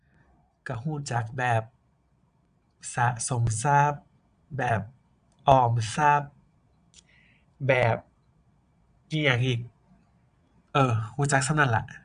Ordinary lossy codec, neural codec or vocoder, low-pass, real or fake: none; vocoder, 22.05 kHz, 80 mel bands, Vocos; 9.9 kHz; fake